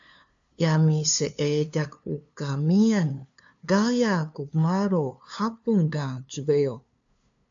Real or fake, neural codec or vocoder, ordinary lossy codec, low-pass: fake; codec, 16 kHz, 2 kbps, FunCodec, trained on LibriTTS, 25 frames a second; AAC, 64 kbps; 7.2 kHz